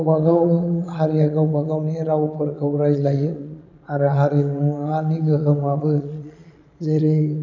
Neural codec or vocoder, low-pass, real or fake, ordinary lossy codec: vocoder, 22.05 kHz, 80 mel bands, Vocos; 7.2 kHz; fake; none